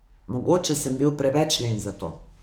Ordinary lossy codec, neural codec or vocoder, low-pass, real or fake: none; codec, 44.1 kHz, 7.8 kbps, DAC; none; fake